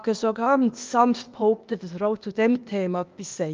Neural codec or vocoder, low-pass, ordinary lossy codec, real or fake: codec, 16 kHz, 0.8 kbps, ZipCodec; 7.2 kHz; Opus, 32 kbps; fake